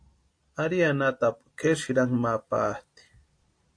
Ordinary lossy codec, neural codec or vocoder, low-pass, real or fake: AAC, 48 kbps; none; 9.9 kHz; real